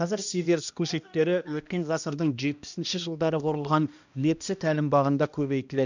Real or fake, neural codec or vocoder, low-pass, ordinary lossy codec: fake; codec, 16 kHz, 1 kbps, X-Codec, HuBERT features, trained on balanced general audio; 7.2 kHz; none